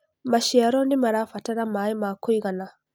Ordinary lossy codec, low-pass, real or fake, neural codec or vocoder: none; none; real; none